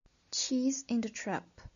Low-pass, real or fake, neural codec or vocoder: 7.2 kHz; real; none